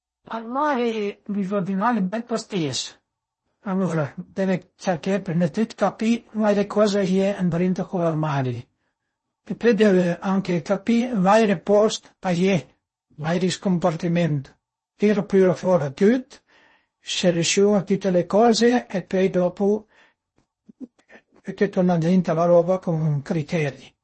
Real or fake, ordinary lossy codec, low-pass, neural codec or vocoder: fake; MP3, 32 kbps; 10.8 kHz; codec, 16 kHz in and 24 kHz out, 0.6 kbps, FocalCodec, streaming, 4096 codes